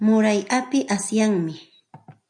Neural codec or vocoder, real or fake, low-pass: none; real; 9.9 kHz